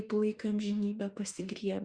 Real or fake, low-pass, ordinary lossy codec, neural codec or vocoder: fake; 9.9 kHz; Opus, 64 kbps; autoencoder, 48 kHz, 32 numbers a frame, DAC-VAE, trained on Japanese speech